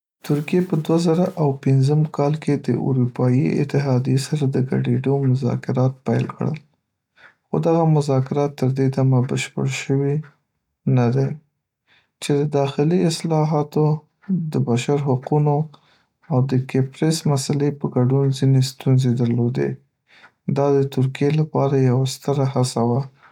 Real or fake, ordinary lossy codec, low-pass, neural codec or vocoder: real; none; 19.8 kHz; none